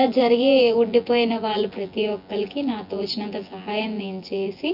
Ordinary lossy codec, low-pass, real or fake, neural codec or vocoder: none; 5.4 kHz; fake; vocoder, 24 kHz, 100 mel bands, Vocos